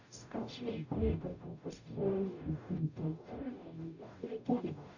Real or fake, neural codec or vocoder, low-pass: fake; codec, 44.1 kHz, 0.9 kbps, DAC; 7.2 kHz